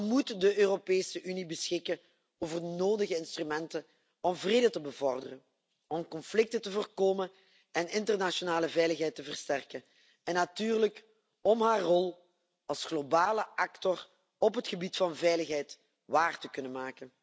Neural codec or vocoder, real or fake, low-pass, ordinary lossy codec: none; real; none; none